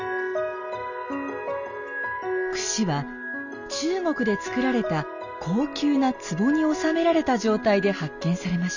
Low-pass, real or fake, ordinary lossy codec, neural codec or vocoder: 7.2 kHz; real; none; none